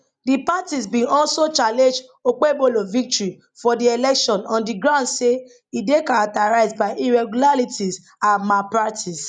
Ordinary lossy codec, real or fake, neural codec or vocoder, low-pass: none; real; none; 9.9 kHz